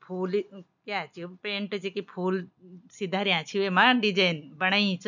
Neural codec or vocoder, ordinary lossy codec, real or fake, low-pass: none; none; real; 7.2 kHz